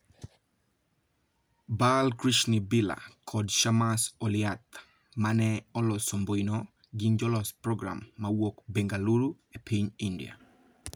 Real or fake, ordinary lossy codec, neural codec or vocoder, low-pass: real; none; none; none